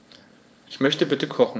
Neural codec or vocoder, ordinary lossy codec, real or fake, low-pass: codec, 16 kHz, 4.8 kbps, FACodec; none; fake; none